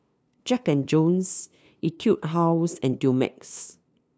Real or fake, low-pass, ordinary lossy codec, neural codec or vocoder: fake; none; none; codec, 16 kHz, 2 kbps, FunCodec, trained on LibriTTS, 25 frames a second